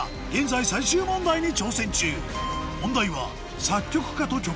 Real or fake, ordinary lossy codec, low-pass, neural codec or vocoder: real; none; none; none